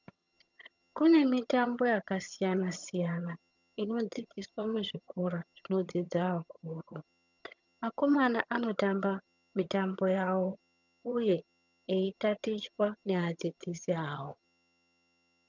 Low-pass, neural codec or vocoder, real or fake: 7.2 kHz; vocoder, 22.05 kHz, 80 mel bands, HiFi-GAN; fake